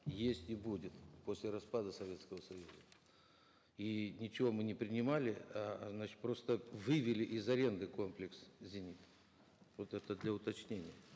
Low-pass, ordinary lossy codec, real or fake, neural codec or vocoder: none; none; real; none